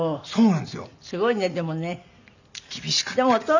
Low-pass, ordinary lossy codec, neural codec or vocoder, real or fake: 7.2 kHz; none; none; real